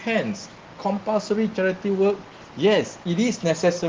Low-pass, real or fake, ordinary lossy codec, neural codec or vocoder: 7.2 kHz; real; Opus, 16 kbps; none